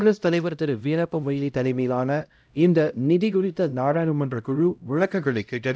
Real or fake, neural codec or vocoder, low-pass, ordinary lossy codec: fake; codec, 16 kHz, 0.5 kbps, X-Codec, HuBERT features, trained on LibriSpeech; none; none